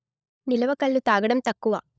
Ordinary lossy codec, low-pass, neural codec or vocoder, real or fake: none; none; codec, 16 kHz, 16 kbps, FunCodec, trained on LibriTTS, 50 frames a second; fake